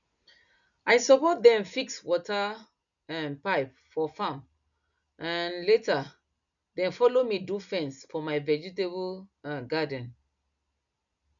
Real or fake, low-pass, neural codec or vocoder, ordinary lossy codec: real; 7.2 kHz; none; none